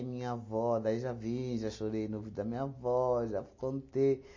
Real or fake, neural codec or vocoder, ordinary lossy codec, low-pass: real; none; MP3, 32 kbps; 7.2 kHz